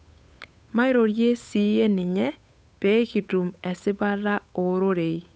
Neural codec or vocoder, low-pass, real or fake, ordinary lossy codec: codec, 16 kHz, 8 kbps, FunCodec, trained on Chinese and English, 25 frames a second; none; fake; none